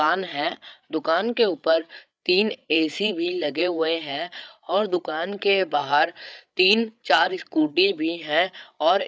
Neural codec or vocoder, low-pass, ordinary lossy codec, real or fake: codec, 16 kHz, 8 kbps, FreqCodec, larger model; none; none; fake